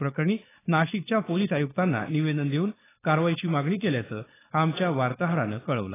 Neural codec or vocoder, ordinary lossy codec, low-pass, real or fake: codec, 16 kHz, 4.8 kbps, FACodec; AAC, 16 kbps; 3.6 kHz; fake